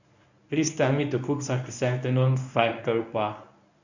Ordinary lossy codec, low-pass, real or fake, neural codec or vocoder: none; 7.2 kHz; fake; codec, 24 kHz, 0.9 kbps, WavTokenizer, medium speech release version 1